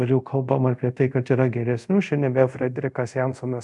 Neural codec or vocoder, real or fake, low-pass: codec, 24 kHz, 0.5 kbps, DualCodec; fake; 10.8 kHz